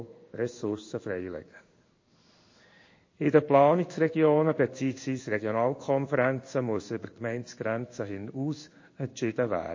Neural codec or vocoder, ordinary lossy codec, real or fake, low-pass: codec, 16 kHz in and 24 kHz out, 1 kbps, XY-Tokenizer; MP3, 32 kbps; fake; 7.2 kHz